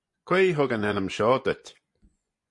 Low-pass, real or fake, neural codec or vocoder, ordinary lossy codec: 10.8 kHz; fake; vocoder, 24 kHz, 100 mel bands, Vocos; MP3, 48 kbps